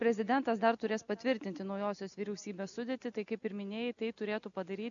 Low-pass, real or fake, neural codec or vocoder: 7.2 kHz; real; none